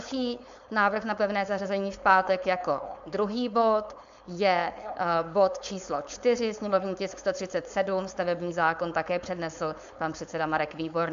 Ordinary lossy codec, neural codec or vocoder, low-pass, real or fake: MP3, 64 kbps; codec, 16 kHz, 4.8 kbps, FACodec; 7.2 kHz; fake